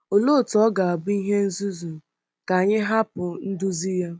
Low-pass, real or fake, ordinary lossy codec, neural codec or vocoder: none; real; none; none